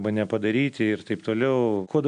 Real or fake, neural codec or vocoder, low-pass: real; none; 9.9 kHz